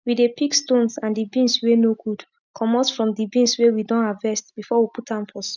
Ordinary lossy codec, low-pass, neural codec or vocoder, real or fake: none; 7.2 kHz; none; real